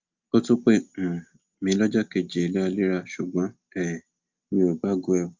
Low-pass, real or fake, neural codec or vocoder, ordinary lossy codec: 7.2 kHz; real; none; Opus, 24 kbps